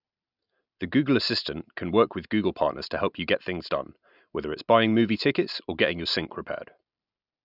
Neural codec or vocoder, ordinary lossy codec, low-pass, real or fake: none; none; 5.4 kHz; real